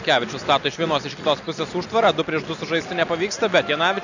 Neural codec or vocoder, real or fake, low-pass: none; real; 7.2 kHz